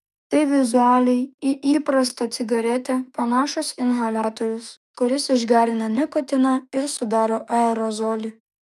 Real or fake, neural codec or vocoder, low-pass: fake; autoencoder, 48 kHz, 32 numbers a frame, DAC-VAE, trained on Japanese speech; 14.4 kHz